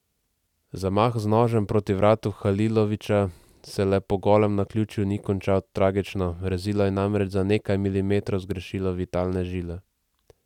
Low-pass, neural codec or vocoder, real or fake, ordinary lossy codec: 19.8 kHz; none; real; none